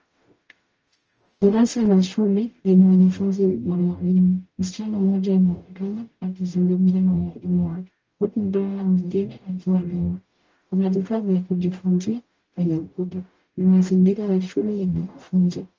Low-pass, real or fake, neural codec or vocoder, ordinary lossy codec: 7.2 kHz; fake; codec, 44.1 kHz, 0.9 kbps, DAC; Opus, 24 kbps